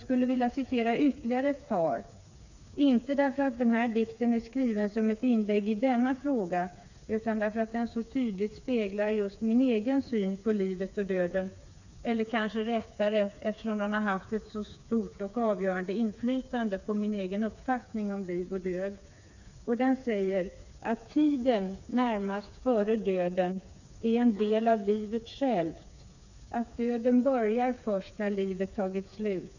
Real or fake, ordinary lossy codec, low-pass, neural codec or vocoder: fake; none; 7.2 kHz; codec, 16 kHz, 4 kbps, FreqCodec, smaller model